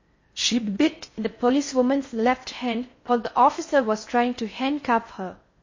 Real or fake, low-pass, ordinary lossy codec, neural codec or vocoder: fake; 7.2 kHz; MP3, 32 kbps; codec, 16 kHz in and 24 kHz out, 0.6 kbps, FocalCodec, streaming, 4096 codes